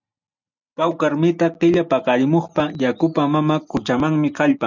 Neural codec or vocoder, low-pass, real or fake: none; 7.2 kHz; real